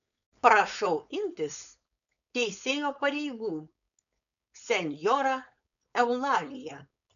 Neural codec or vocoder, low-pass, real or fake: codec, 16 kHz, 4.8 kbps, FACodec; 7.2 kHz; fake